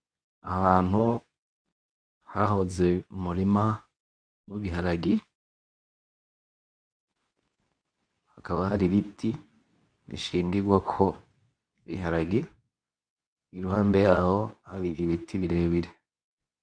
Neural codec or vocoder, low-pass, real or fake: codec, 24 kHz, 0.9 kbps, WavTokenizer, medium speech release version 2; 9.9 kHz; fake